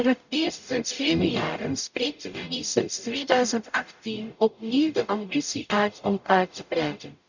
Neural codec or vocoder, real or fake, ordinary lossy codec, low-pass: codec, 44.1 kHz, 0.9 kbps, DAC; fake; none; 7.2 kHz